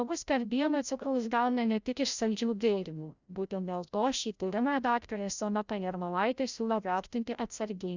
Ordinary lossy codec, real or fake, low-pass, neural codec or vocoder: Opus, 64 kbps; fake; 7.2 kHz; codec, 16 kHz, 0.5 kbps, FreqCodec, larger model